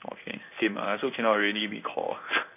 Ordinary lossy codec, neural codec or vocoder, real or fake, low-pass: none; codec, 16 kHz in and 24 kHz out, 1 kbps, XY-Tokenizer; fake; 3.6 kHz